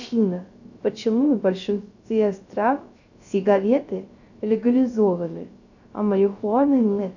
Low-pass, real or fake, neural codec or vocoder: 7.2 kHz; fake; codec, 16 kHz, 0.3 kbps, FocalCodec